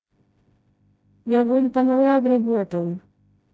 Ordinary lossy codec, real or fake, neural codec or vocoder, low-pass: none; fake; codec, 16 kHz, 0.5 kbps, FreqCodec, smaller model; none